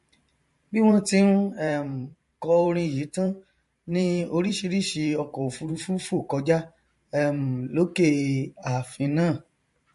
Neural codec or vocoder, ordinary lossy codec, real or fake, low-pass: vocoder, 44.1 kHz, 128 mel bands every 512 samples, BigVGAN v2; MP3, 48 kbps; fake; 14.4 kHz